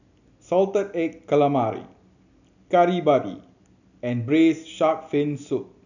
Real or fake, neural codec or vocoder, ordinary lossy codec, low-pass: real; none; none; 7.2 kHz